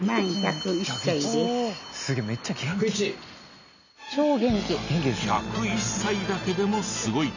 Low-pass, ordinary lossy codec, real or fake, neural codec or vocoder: 7.2 kHz; none; real; none